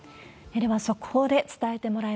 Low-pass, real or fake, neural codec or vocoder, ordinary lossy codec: none; real; none; none